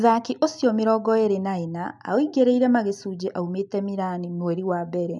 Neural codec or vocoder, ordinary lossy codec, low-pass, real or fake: none; none; 10.8 kHz; real